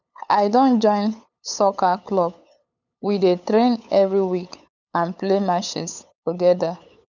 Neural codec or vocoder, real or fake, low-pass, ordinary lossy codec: codec, 16 kHz, 8 kbps, FunCodec, trained on LibriTTS, 25 frames a second; fake; 7.2 kHz; none